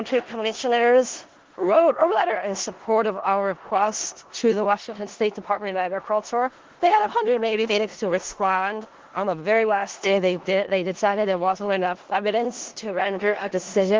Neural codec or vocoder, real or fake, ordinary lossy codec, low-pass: codec, 16 kHz in and 24 kHz out, 0.4 kbps, LongCat-Audio-Codec, four codebook decoder; fake; Opus, 16 kbps; 7.2 kHz